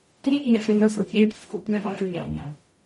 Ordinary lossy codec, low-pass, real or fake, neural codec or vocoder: MP3, 48 kbps; 19.8 kHz; fake; codec, 44.1 kHz, 0.9 kbps, DAC